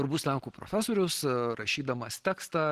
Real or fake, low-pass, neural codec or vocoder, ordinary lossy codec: real; 14.4 kHz; none; Opus, 16 kbps